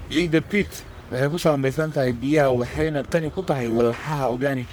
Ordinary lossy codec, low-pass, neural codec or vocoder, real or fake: none; none; codec, 44.1 kHz, 1.7 kbps, Pupu-Codec; fake